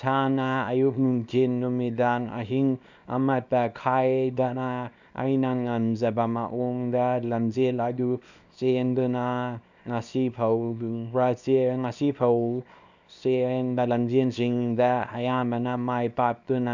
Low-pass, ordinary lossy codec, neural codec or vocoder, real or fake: 7.2 kHz; none; codec, 24 kHz, 0.9 kbps, WavTokenizer, small release; fake